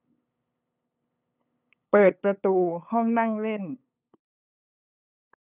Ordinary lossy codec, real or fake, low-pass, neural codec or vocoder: none; fake; 3.6 kHz; codec, 16 kHz, 8 kbps, FunCodec, trained on LibriTTS, 25 frames a second